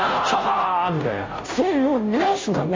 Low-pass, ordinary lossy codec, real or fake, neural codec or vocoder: 7.2 kHz; MP3, 32 kbps; fake; codec, 16 kHz, 0.5 kbps, FunCodec, trained on Chinese and English, 25 frames a second